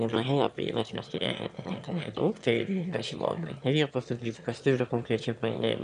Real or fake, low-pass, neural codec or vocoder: fake; 9.9 kHz; autoencoder, 22.05 kHz, a latent of 192 numbers a frame, VITS, trained on one speaker